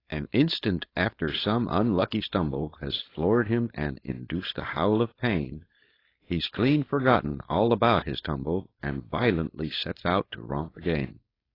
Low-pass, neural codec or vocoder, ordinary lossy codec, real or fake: 5.4 kHz; codec, 16 kHz, 4.8 kbps, FACodec; AAC, 24 kbps; fake